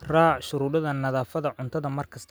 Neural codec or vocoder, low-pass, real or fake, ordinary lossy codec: none; none; real; none